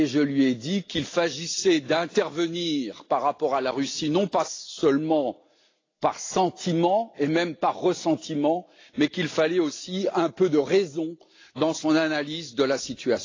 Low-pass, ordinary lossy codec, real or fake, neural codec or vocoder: 7.2 kHz; AAC, 32 kbps; real; none